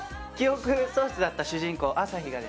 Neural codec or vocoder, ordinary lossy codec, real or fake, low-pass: none; none; real; none